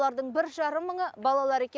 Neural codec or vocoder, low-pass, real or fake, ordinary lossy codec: none; none; real; none